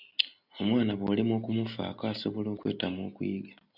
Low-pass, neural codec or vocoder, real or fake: 5.4 kHz; none; real